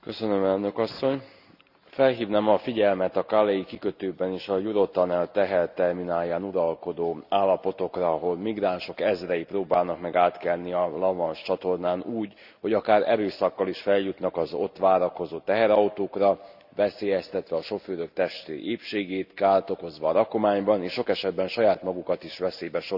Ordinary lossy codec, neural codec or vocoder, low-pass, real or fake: Opus, 64 kbps; none; 5.4 kHz; real